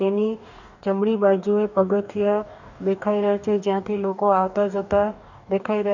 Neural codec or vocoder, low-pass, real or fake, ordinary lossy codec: codec, 44.1 kHz, 2.6 kbps, DAC; 7.2 kHz; fake; none